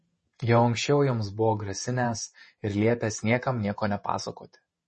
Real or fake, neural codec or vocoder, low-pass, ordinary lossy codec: fake; vocoder, 48 kHz, 128 mel bands, Vocos; 10.8 kHz; MP3, 32 kbps